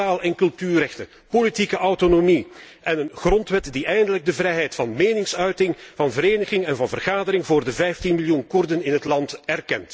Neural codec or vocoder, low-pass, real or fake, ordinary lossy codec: none; none; real; none